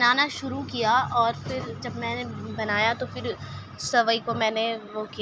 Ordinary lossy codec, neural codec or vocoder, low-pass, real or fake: none; none; none; real